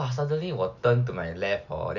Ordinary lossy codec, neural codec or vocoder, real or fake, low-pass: none; none; real; 7.2 kHz